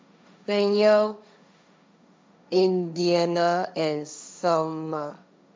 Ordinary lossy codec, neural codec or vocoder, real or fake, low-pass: none; codec, 16 kHz, 1.1 kbps, Voila-Tokenizer; fake; none